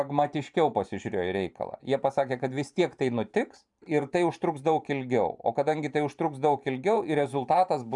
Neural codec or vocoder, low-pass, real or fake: vocoder, 44.1 kHz, 128 mel bands every 512 samples, BigVGAN v2; 10.8 kHz; fake